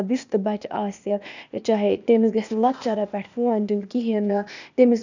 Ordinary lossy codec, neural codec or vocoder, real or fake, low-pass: none; codec, 16 kHz, 0.8 kbps, ZipCodec; fake; 7.2 kHz